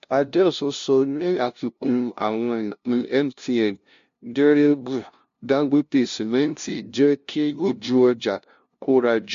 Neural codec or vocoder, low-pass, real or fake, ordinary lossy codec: codec, 16 kHz, 0.5 kbps, FunCodec, trained on LibriTTS, 25 frames a second; 7.2 kHz; fake; MP3, 48 kbps